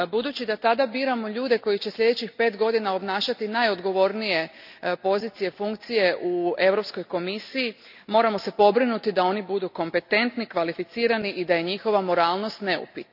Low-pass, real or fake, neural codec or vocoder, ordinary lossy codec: 5.4 kHz; real; none; none